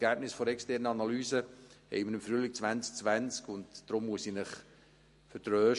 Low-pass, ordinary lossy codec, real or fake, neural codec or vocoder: 14.4 kHz; MP3, 48 kbps; real; none